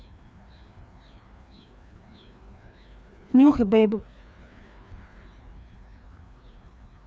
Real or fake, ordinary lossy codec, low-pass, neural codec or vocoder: fake; none; none; codec, 16 kHz, 2 kbps, FreqCodec, larger model